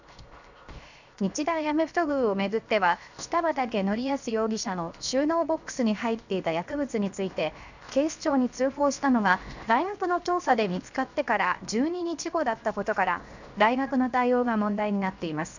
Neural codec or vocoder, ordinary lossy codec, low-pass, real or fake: codec, 16 kHz, 0.7 kbps, FocalCodec; none; 7.2 kHz; fake